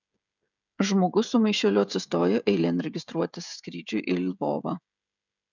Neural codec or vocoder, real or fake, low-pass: codec, 16 kHz, 16 kbps, FreqCodec, smaller model; fake; 7.2 kHz